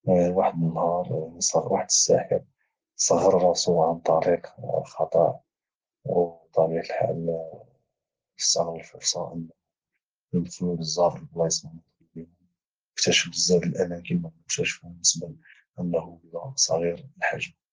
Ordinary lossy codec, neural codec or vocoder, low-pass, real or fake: Opus, 16 kbps; none; 7.2 kHz; real